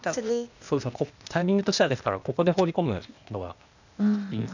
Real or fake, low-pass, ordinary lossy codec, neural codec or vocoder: fake; 7.2 kHz; none; codec, 16 kHz, 0.8 kbps, ZipCodec